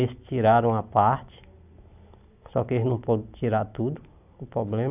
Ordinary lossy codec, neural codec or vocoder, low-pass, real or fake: none; none; 3.6 kHz; real